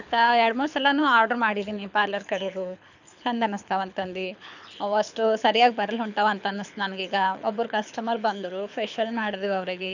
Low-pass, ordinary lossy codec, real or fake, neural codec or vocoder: 7.2 kHz; none; fake; codec, 24 kHz, 6 kbps, HILCodec